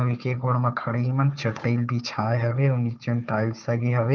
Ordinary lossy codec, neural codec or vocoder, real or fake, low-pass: Opus, 32 kbps; codec, 16 kHz, 4 kbps, FunCodec, trained on Chinese and English, 50 frames a second; fake; 7.2 kHz